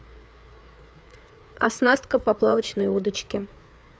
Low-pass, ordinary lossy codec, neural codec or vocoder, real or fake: none; none; codec, 16 kHz, 4 kbps, FreqCodec, larger model; fake